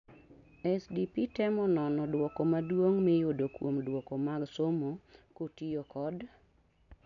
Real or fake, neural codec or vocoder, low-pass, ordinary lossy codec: real; none; 7.2 kHz; none